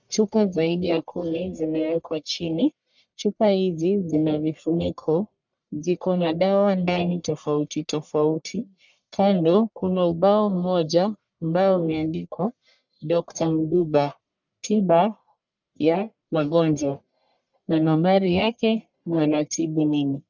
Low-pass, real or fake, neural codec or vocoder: 7.2 kHz; fake; codec, 44.1 kHz, 1.7 kbps, Pupu-Codec